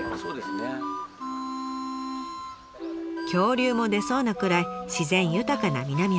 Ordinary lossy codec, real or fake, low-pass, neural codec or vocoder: none; real; none; none